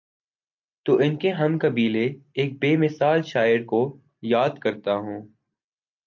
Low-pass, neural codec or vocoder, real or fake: 7.2 kHz; none; real